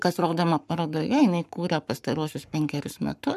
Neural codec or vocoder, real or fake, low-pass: codec, 44.1 kHz, 7.8 kbps, Pupu-Codec; fake; 14.4 kHz